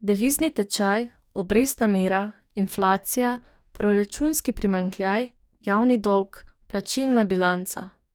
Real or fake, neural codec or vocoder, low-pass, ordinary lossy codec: fake; codec, 44.1 kHz, 2.6 kbps, DAC; none; none